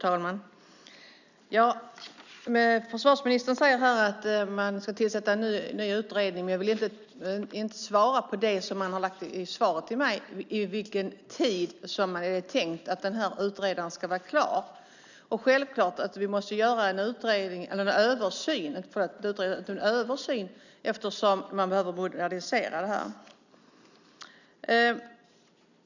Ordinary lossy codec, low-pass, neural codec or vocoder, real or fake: none; 7.2 kHz; none; real